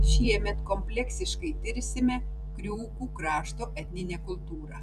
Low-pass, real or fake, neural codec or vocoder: 14.4 kHz; fake; vocoder, 44.1 kHz, 128 mel bands every 512 samples, BigVGAN v2